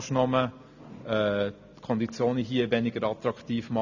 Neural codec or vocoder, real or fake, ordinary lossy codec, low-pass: none; real; none; 7.2 kHz